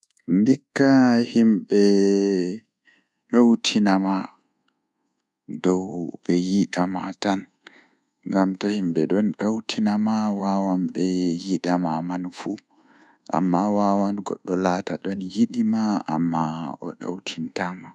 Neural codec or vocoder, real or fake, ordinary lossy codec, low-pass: codec, 24 kHz, 1.2 kbps, DualCodec; fake; none; none